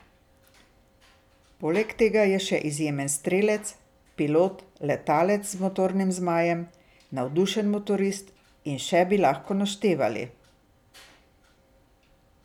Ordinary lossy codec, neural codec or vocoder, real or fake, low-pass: none; none; real; 19.8 kHz